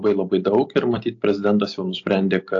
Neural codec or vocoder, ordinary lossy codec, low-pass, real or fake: none; AAC, 48 kbps; 7.2 kHz; real